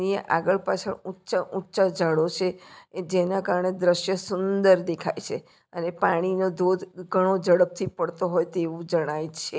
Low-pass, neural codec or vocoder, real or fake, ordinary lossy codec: none; none; real; none